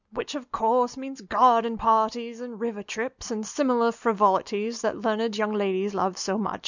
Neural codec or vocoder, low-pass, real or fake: none; 7.2 kHz; real